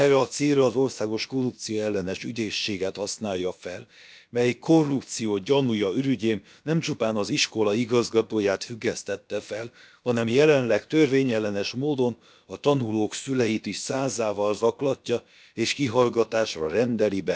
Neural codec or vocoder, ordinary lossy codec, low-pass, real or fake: codec, 16 kHz, about 1 kbps, DyCAST, with the encoder's durations; none; none; fake